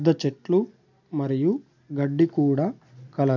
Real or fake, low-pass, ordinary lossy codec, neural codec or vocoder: real; 7.2 kHz; none; none